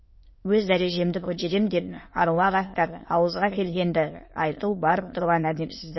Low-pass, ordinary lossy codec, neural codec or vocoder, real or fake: 7.2 kHz; MP3, 24 kbps; autoencoder, 22.05 kHz, a latent of 192 numbers a frame, VITS, trained on many speakers; fake